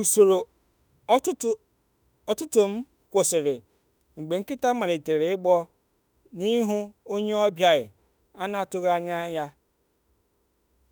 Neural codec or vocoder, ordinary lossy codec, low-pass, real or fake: autoencoder, 48 kHz, 32 numbers a frame, DAC-VAE, trained on Japanese speech; none; none; fake